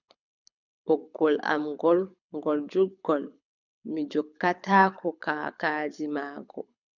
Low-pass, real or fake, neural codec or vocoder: 7.2 kHz; fake; codec, 24 kHz, 6 kbps, HILCodec